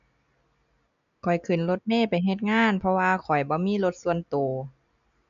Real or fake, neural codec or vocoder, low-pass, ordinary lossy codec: real; none; 7.2 kHz; none